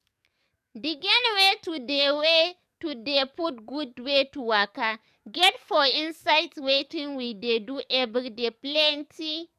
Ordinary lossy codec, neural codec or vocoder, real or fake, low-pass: none; codec, 44.1 kHz, 7.8 kbps, DAC; fake; 14.4 kHz